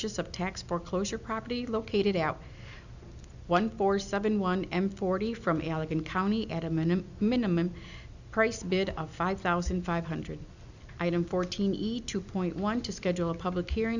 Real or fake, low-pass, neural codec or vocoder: real; 7.2 kHz; none